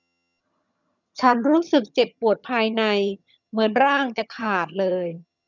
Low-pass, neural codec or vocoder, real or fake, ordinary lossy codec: 7.2 kHz; vocoder, 22.05 kHz, 80 mel bands, HiFi-GAN; fake; none